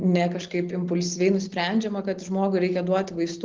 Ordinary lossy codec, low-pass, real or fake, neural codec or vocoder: Opus, 16 kbps; 7.2 kHz; real; none